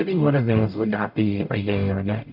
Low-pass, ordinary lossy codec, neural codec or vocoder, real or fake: 5.4 kHz; MP3, 48 kbps; codec, 44.1 kHz, 0.9 kbps, DAC; fake